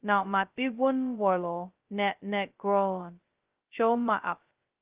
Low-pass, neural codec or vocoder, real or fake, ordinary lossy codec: 3.6 kHz; codec, 16 kHz, 0.2 kbps, FocalCodec; fake; Opus, 32 kbps